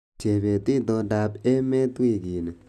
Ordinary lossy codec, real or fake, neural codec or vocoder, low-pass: none; real; none; 14.4 kHz